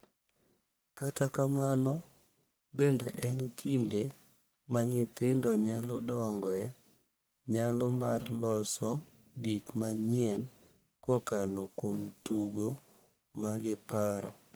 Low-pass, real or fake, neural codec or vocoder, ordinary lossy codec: none; fake; codec, 44.1 kHz, 1.7 kbps, Pupu-Codec; none